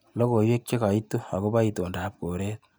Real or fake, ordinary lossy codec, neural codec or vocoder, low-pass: real; none; none; none